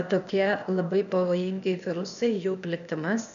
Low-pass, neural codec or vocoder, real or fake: 7.2 kHz; codec, 16 kHz, 0.8 kbps, ZipCodec; fake